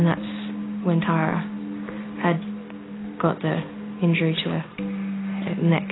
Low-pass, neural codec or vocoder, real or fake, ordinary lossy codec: 7.2 kHz; none; real; AAC, 16 kbps